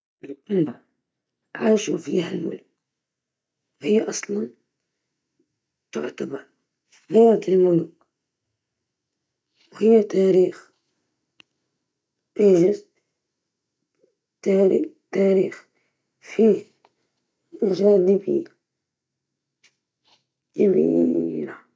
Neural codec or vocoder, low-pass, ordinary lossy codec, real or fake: none; none; none; real